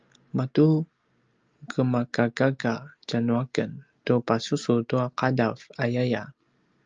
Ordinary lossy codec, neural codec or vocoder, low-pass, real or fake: Opus, 24 kbps; none; 7.2 kHz; real